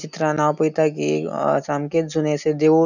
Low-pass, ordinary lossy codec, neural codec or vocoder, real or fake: 7.2 kHz; none; none; real